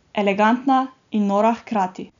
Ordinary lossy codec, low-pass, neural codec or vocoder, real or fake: none; 7.2 kHz; none; real